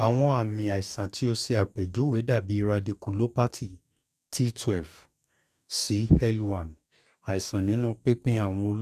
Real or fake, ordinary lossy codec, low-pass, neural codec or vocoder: fake; none; 14.4 kHz; codec, 44.1 kHz, 2.6 kbps, DAC